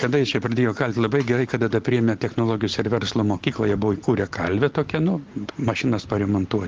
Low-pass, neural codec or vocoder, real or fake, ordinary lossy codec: 7.2 kHz; none; real; Opus, 16 kbps